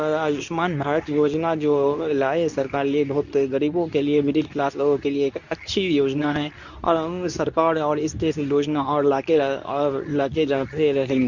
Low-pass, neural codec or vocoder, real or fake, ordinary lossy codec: 7.2 kHz; codec, 24 kHz, 0.9 kbps, WavTokenizer, medium speech release version 2; fake; none